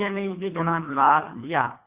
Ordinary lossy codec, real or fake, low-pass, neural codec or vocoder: Opus, 64 kbps; fake; 3.6 kHz; codec, 24 kHz, 1.5 kbps, HILCodec